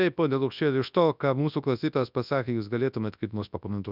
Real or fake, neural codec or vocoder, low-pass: fake; codec, 24 kHz, 0.9 kbps, WavTokenizer, large speech release; 5.4 kHz